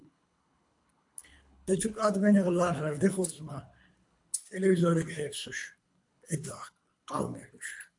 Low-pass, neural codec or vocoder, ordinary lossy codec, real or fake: 10.8 kHz; codec, 24 kHz, 3 kbps, HILCodec; AAC, 64 kbps; fake